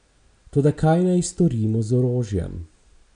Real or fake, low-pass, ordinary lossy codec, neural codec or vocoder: real; 9.9 kHz; none; none